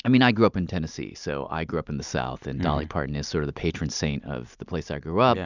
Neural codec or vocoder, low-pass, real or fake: none; 7.2 kHz; real